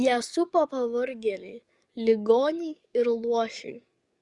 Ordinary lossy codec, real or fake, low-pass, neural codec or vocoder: Opus, 64 kbps; fake; 10.8 kHz; codec, 44.1 kHz, 7.8 kbps, DAC